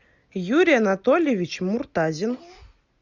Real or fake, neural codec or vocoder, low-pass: real; none; 7.2 kHz